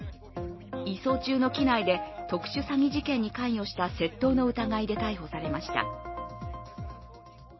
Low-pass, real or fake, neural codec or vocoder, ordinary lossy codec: 7.2 kHz; real; none; MP3, 24 kbps